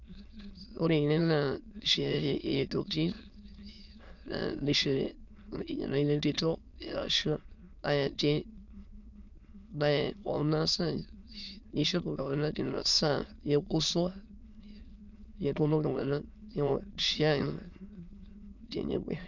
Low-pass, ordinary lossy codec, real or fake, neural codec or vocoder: 7.2 kHz; none; fake; autoencoder, 22.05 kHz, a latent of 192 numbers a frame, VITS, trained on many speakers